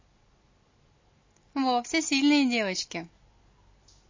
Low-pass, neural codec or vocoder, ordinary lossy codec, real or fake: 7.2 kHz; none; MP3, 32 kbps; real